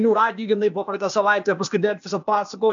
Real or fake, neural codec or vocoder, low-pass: fake; codec, 16 kHz, 0.8 kbps, ZipCodec; 7.2 kHz